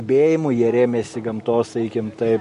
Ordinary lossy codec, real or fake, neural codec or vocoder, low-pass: MP3, 48 kbps; real; none; 10.8 kHz